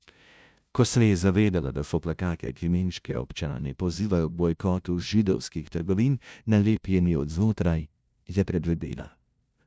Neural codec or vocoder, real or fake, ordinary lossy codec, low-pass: codec, 16 kHz, 0.5 kbps, FunCodec, trained on LibriTTS, 25 frames a second; fake; none; none